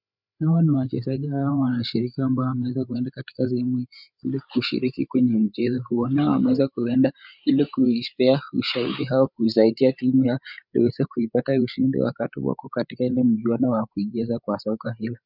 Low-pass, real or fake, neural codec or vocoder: 5.4 kHz; fake; codec, 16 kHz, 8 kbps, FreqCodec, larger model